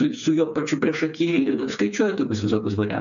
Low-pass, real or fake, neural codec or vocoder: 7.2 kHz; fake; codec, 16 kHz, 4 kbps, FreqCodec, smaller model